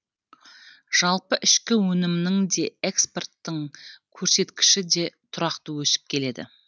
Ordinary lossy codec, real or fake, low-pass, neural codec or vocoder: none; real; none; none